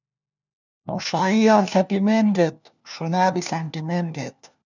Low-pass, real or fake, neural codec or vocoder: 7.2 kHz; fake; codec, 16 kHz, 1 kbps, FunCodec, trained on LibriTTS, 50 frames a second